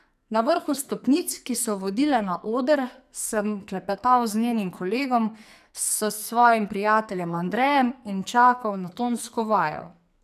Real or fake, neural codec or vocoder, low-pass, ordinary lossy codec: fake; codec, 44.1 kHz, 2.6 kbps, SNAC; 14.4 kHz; none